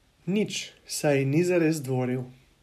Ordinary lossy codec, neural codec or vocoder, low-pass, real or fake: MP3, 96 kbps; none; 14.4 kHz; real